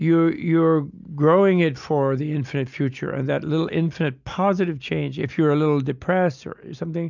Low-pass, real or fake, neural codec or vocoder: 7.2 kHz; real; none